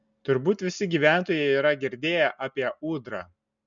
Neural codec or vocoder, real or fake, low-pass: none; real; 7.2 kHz